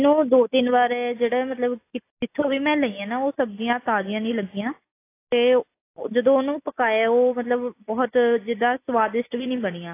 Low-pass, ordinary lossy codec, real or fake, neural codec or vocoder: 3.6 kHz; AAC, 24 kbps; real; none